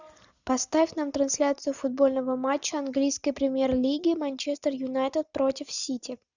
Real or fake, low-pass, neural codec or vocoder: real; 7.2 kHz; none